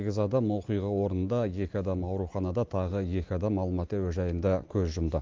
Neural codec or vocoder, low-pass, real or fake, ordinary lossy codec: none; 7.2 kHz; real; Opus, 32 kbps